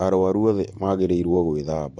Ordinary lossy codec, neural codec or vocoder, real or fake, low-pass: MP3, 48 kbps; vocoder, 44.1 kHz, 128 mel bands every 512 samples, BigVGAN v2; fake; 10.8 kHz